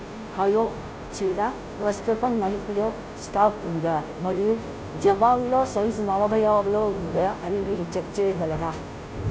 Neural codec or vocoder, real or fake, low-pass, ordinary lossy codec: codec, 16 kHz, 0.5 kbps, FunCodec, trained on Chinese and English, 25 frames a second; fake; none; none